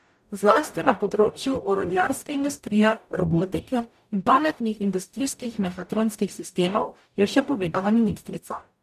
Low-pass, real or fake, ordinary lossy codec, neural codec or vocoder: 14.4 kHz; fake; none; codec, 44.1 kHz, 0.9 kbps, DAC